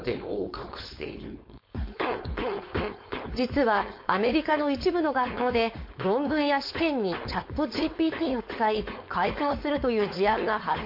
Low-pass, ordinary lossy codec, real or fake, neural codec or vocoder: 5.4 kHz; MP3, 32 kbps; fake; codec, 16 kHz, 4.8 kbps, FACodec